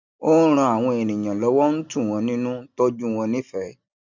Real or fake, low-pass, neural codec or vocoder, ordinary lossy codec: real; 7.2 kHz; none; none